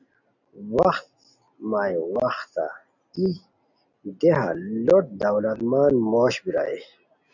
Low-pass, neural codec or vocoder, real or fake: 7.2 kHz; none; real